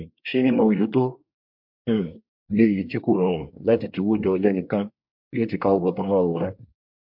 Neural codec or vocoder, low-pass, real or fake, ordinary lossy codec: codec, 24 kHz, 1 kbps, SNAC; 5.4 kHz; fake; MP3, 48 kbps